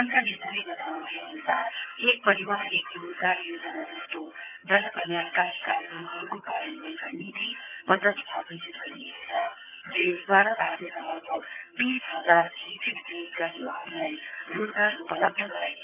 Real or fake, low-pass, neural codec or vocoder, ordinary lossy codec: fake; 3.6 kHz; vocoder, 22.05 kHz, 80 mel bands, HiFi-GAN; none